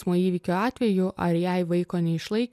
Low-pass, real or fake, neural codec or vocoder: 14.4 kHz; real; none